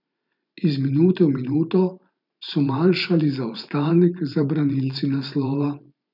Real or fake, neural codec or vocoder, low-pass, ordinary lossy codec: fake; vocoder, 44.1 kHz, 128 mel bands every 512 samples, BigVGAN v2; 5.4 kHz; none